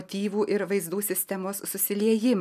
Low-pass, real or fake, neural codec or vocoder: 14.4 kHz; real; none